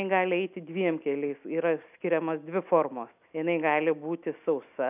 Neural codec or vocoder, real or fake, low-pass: none; real; 3.6 kHz